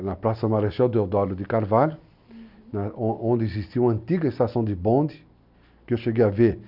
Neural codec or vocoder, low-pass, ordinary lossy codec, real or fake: none; 5.4 kHz; none; real